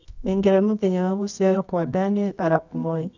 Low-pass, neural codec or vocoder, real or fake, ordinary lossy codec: 7.2 kHz; codec, 24 kHz, 0.9 kbps, WavTokenizer, medium music audio release; fake; none